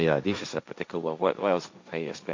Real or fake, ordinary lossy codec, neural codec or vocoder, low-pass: fake; MP3, 48 kbps; codec, 16 kHz, 1.1 kbps, Voila-Tokenizer; 7.2 kHz